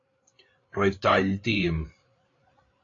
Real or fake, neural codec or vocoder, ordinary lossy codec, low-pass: fake; codec, 16 kHz, 8 kbps, FreqCodec, larger model; AAC, 32 kbps; 7.2 kHz